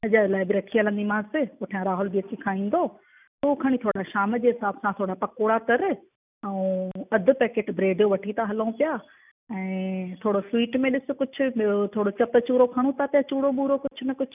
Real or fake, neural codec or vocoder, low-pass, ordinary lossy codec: real; none; 3.6 kHz; none